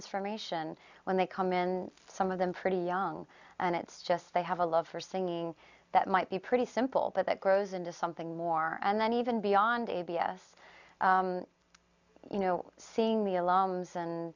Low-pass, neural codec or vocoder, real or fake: 7.2 kHz; none; real